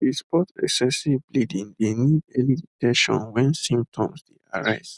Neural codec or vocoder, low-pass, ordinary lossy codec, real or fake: none; 10.8 kHz; none; real